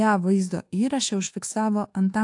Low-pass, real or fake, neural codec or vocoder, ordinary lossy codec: 10.8 kHz; fake; codec, 24 kHz, 1.2 kbps, DualCodec; AAC, 48 kbps